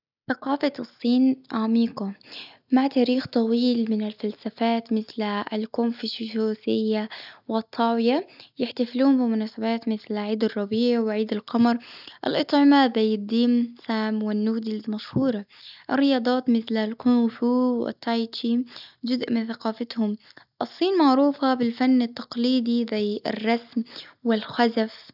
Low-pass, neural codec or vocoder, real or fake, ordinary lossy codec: 5.4 kHz; none; real; none